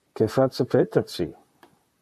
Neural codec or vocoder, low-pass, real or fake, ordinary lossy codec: vocoder, 44.1 kHz, 128 mel bands, Pupu-Vocoder; 14.4 kHz; fake; MP3, 96 kbps